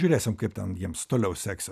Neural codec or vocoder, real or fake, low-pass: none; real; 14.4 kHz